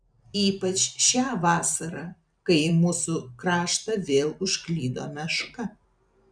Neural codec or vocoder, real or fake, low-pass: vocoder, 44.1 kHz, 128 mel bands every 256 samples, BigVGAN v2; fake; 9.9 kHz